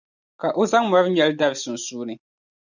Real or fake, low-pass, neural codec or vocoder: real; 7.2 kHz; none